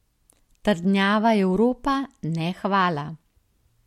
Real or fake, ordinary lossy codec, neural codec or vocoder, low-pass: real; MP3, 64 kbps; none; 19.8 kHz